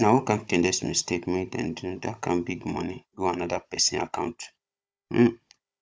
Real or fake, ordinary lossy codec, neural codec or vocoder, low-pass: fake; none; codec, 16 kHz, 16 kbps, FunCodec, trained on Chinese and English, 50 frames a second; none